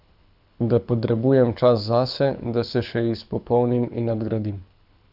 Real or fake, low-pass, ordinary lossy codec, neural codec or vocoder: fake; 5.4 kHz; none; codec, 24 kHz, 6 kbps, HILCodec